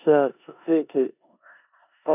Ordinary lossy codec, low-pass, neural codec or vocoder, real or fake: none; 3.6 kHz; codec, 16 kHz in and 24 kHz out, 0.9 kbps, LongCat-Audio-Codec, four codebook decoder; fake